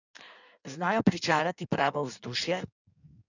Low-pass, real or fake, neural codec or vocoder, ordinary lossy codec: 7.2 kHz; fake; codec, 16 kHz in and 24 kHz out, 1.1 kbps, FireRedTTS-2 codec; none